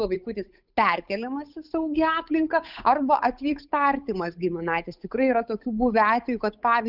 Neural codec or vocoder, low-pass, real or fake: codec, 16 kHz, 8 kbps, FunCodec, trained on Chinese and English, 25 frames a second; 5.4 kHz; fake